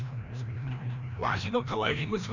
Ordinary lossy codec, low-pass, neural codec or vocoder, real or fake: none; 7.2 kHz; codec, 16 kHz, 1 kbps, FreqCodec, larger model; fake